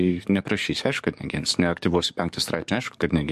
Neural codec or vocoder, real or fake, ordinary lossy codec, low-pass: codec, 44.1 kHz, 7.8 kbps, DAC; fake; MP3, 64 kbps; 14.4 kHz